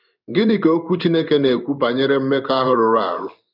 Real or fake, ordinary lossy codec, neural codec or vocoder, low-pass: fake; none; codec, 16 kHz in and 24 kHz out, 1 kbps, XY-Tokenizer; 5.4 kHz